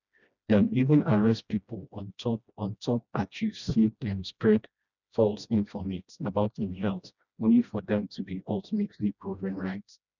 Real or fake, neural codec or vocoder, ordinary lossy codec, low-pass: fake; codec, 16 kHz, 1 kbps, FreqCodec, smaller model; none; 7.2 kHz